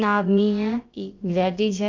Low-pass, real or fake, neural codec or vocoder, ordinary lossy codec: 7.2 kHz; fake; codec, 16 kHz, about 1 kbps, DyCAST, with the encoder's durations; Opus, 24 kbps